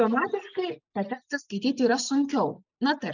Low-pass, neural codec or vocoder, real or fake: 7.2 kHz; none; real